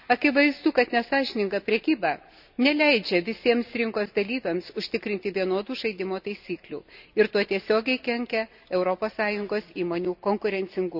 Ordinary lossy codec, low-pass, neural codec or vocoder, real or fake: none; 5.4 kHz; none; real